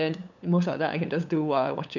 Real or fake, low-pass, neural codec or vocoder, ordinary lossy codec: fake; 7.2 kHz; codec, 16 kHz, 8 kbps, FunCodec, trained on LibriTTS, 25 frames a second; none